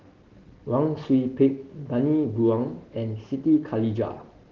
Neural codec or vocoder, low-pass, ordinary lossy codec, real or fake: none; 7.2 kHz; Opus, 16 kbps; real